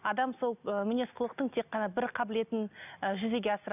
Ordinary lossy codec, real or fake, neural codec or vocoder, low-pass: none; real; none; 3.6 kHz